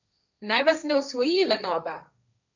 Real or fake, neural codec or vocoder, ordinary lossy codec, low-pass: fake; codec, 16 kHz, 1.1 kbps, Voila-Tokenizer; none; 7.2 kHz